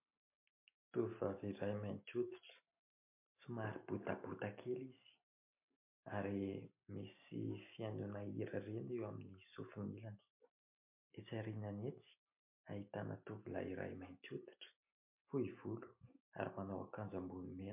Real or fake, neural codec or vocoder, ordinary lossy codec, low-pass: real; none; MP3, 32 kbps; 3.6 kHz